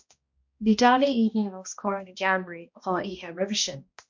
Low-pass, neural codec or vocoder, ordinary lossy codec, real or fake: 7.2 kHz; codec, 16 kHz, 1 kbps, X-Codec, HuBERT features, trained on balanced general audio; MP3, 48 kbps; fake